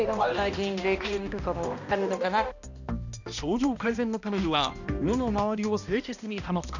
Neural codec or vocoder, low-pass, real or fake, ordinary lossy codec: codec, 16 kHz, 1 kbps, X-Codec, HuBERT features, trained on balanced general audio; 7.2 kHz; fake; none